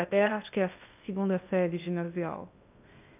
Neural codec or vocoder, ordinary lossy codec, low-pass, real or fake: codec, 16 kHz in and 24 kHz out, 0.6 kbps, FocalCodec, streaming, 2048 codes; none; 3.6 kHz; fake